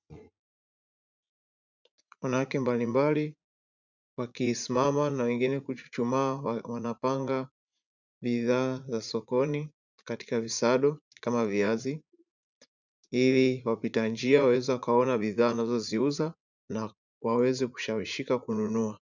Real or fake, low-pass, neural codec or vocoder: fake; 7.2 kHz; vocoder, 44.1 kHz, 80 mel bands, Vocos